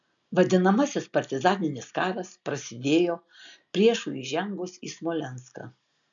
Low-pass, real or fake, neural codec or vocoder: 7.2 kHz; real; none